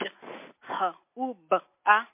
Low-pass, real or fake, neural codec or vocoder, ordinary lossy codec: 3.6 kHz; real; none; MP3, 24 kbps